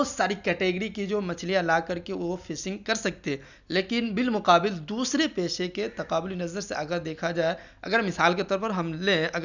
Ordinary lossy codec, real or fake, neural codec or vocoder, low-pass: none; real; none; 7.2 kHz